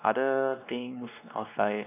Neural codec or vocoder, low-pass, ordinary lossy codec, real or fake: codec, 16 kHz, 2 kbps, X-Codec, WavLM features, trained on Multilingual LibriSpeech; 3.6 kHz; none; fake